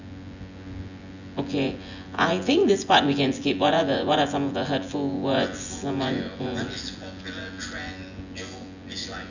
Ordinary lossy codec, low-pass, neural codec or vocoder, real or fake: none; 7.2 kHz; vocoder, 24 kHz, 100 mel bands, Vocos; fake